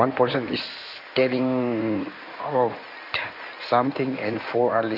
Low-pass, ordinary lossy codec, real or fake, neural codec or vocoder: 5.4 kHz; none; fake; codec, 16 kHz in and 24 kHz out, 2.2 kbps, FireRedTTS-2 codec